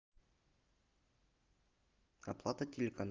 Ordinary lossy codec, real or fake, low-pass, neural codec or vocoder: Opus, 32 kbps; real; 7.2 kHz; none